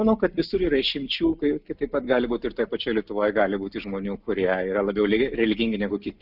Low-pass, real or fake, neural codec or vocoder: 5.4 kHz; real; none